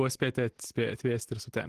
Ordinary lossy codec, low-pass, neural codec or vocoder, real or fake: Opus, 16 kbps; 14.4 kHz; none; real